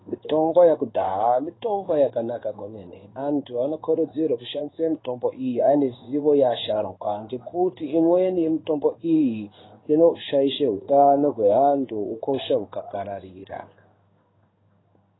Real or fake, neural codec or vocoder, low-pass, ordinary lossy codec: fake; codec, 16 kHz in and 24 kHz out, 1 kbps, XY-Tokenizer; 7.2 kHz; AAC, 16 kbps